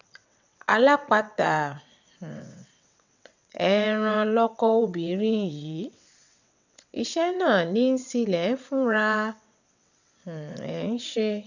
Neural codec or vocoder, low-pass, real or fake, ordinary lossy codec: vocoder, 22.05 kHz, 80 mel bands, WaveNeXt; 7.2 kHz; fake; none